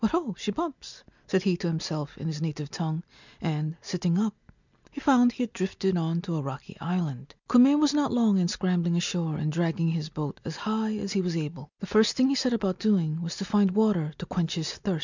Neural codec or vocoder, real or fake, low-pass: none; real; 7.2 kHz